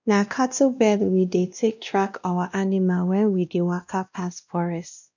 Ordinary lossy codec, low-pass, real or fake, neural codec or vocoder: none; 7.2 kHz; fake; codec, 16 kHz, 1 kbps, X-Codec, WavLM features, trained on Multilingual LibriSpeech